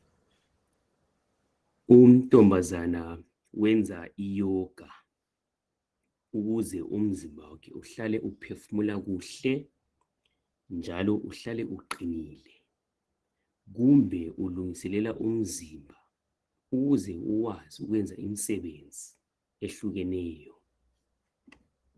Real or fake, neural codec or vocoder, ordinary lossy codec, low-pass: real; none; Opus, 16 kbps; 10.8 kHz